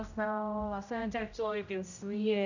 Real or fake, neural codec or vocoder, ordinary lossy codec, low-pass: fake; codec, 16 kHz, 0.5 kbps, X-Codec, HuBERT features, trained on general audio; none; 7.2 kHz